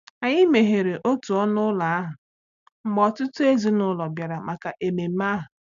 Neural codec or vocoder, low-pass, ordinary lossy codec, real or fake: none; 7.2 kHz; none; real